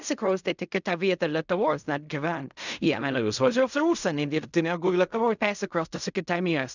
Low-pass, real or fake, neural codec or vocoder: 7.2 kHz; fake; codec, 16 kHz in and 24 kHz out, 0.4 kbps, LongCat-Audio-Codec, fine tuned four codebook decoder